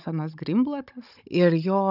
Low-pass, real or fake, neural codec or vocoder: 5.4 kHz; fake; codec, 16 kHz, 16 kbps, FunCodec, trained on Chinese and English, 50 frames a second